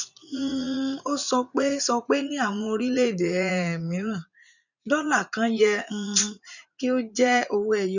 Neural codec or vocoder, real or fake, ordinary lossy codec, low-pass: vocoder, 44.1 kHz, 80 mel bands, Vocos; fake; none; 7.2 kHz